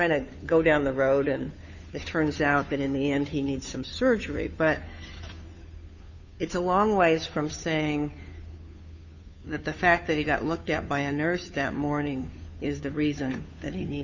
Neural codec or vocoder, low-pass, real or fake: codec, 16 kHz, 2 kbps, FunCodec, trained on Chinese and English, 25 frames a second; 7.2 kHz; fake